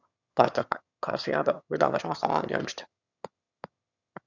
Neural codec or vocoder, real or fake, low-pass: autoencoder, 22.05 kHz, a latent of 192 numbers a frame, VITS, trained on one speaker; fake; 7.2 kHz